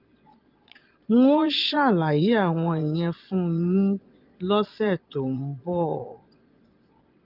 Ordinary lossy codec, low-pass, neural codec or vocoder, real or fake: Opus, 24 kbps; 5.4 kHz; vocoder, 22.05 kHz, 80 mel bands, Vocos; fake